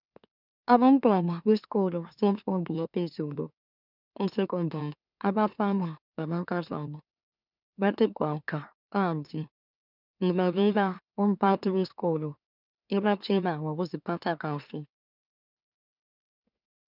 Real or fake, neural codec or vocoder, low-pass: fake; autoencoder, 44.1 kHz, a latent of 192 numbers a frame, MeloTTS; 5.4 kHz